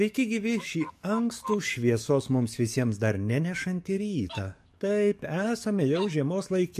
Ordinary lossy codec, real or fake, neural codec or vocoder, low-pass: MP3, 64 kbps; fake; codec, 44.1 kHz, 7.8 kbps, DAC; 14.4 kHz